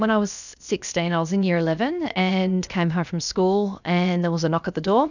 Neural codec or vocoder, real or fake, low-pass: codec, 16 kHz, 0.7 kbps, FocalCodec; fake; 7.2 kHz